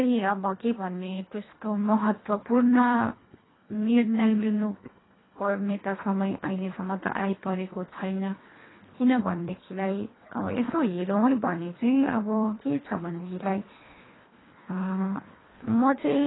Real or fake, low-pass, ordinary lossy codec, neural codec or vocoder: fake; 7.2 kHz; AAC, 16 kbps; codec, 24 kHz, 1.5 kbps, HILCodec